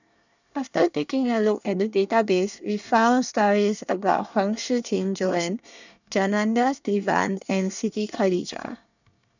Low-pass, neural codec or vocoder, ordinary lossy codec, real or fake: 7.2 kHz; codec, 24 kHz, 1 kbps, SNAC; none; fake